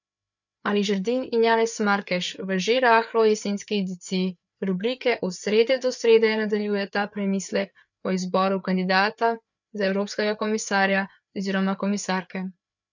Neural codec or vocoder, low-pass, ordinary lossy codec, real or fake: codec, 16 kHz, 4 kbps, FreqCodec, larger model; 7.2 kHz; none; fake